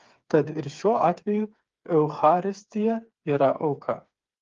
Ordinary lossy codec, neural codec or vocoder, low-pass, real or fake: Opus, 32 kbps; codec, 16 kHz, 4 kbps, FreqCodec, smaller model; 7.2 kHz; fake